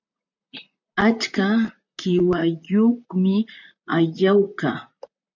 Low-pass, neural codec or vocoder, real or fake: 7.2 kHz; vocoder, 44.1 kHz, 128 mel bands, Pupu-Vocoder; fake